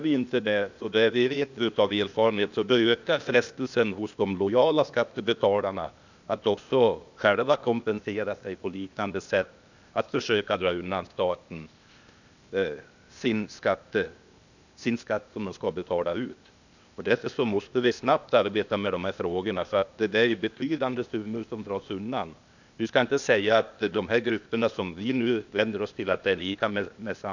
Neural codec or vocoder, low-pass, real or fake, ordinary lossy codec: codec, 16 kHz, 0.8 kbps, ZipCodec; 7.2 kHz; fake; none